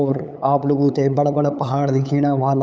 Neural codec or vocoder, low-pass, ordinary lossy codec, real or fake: codec, 16 kHz, 8 kbps, FunCodec, trained on LibriTTS, 25 frames a second; none; none; fake